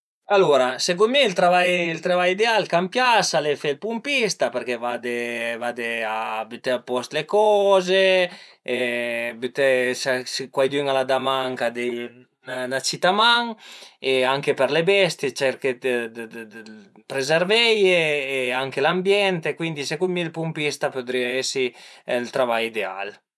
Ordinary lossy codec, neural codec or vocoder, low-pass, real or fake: none; vocoder, 24 kHz, 100 mel bands, Vocos; none; fake